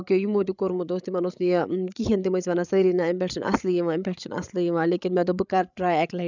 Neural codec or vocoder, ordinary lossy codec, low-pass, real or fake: codec, 16 kHz, 8 kbps, FreqCodec, larger model; none; 7.2 kHz; fake